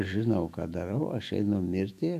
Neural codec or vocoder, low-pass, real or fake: autoencoder, 48 kHz, 128 numbers a frame, DAC-VAE, trained on Japanese speech; 14.4 kHz; fake